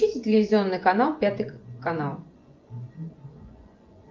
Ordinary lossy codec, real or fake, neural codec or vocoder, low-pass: Opus, 24 kbps; fake; vocoder, 24 kHz, 100 mel bands, Vocos; 7.2 kHz